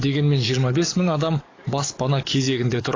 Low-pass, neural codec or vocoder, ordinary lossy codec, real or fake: 7.2 kHz; codec, 44.1 kHz, 7.8 kbps, DAC; AAC, 32 kbps; fake